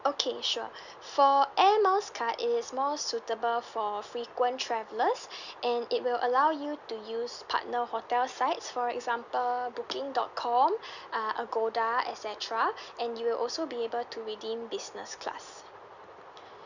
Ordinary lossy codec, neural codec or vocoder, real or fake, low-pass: none; none; real; 7.2 kHz